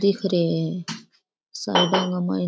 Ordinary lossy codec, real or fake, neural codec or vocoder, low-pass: none; real; none; none